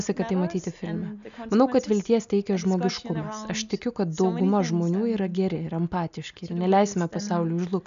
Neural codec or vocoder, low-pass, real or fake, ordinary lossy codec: none; 7.2 kHz; real; AAC, 96 kbps